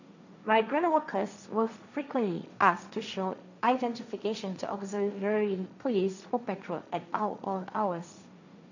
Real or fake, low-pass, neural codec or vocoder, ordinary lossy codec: fake; 7.2 kHz; codec, 16 kHz, 1.1 kbps, Voila-Tokenizer; none